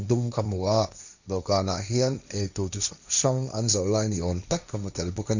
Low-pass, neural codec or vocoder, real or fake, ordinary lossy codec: 7.2 kHz; codec, 16 kHz, 1.1 kbps, Voila-Tokenizer; fake; none